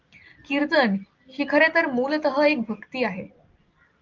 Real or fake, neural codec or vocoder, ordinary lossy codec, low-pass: real; none; Opus, 32 kbps; 7.2 kHz